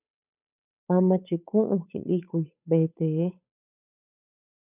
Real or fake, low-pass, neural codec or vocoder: fake; 3.6 kHz; codec, 16 kHz, 8 kbps, FunCodec, trained on Chinese and English, 25 frames a second